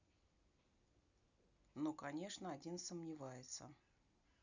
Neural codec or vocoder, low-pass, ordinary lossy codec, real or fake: none; 7.2 kHz; none; real